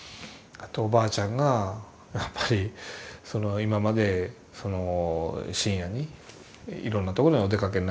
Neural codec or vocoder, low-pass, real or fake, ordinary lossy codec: none; none; real; none